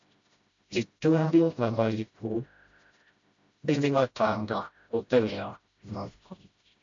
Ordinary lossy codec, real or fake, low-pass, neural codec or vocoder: AAC, 48 kbps; fake; 7.2 kHz; codec, 16 kHz, 0.5 kbps, FreqCodec, smaller model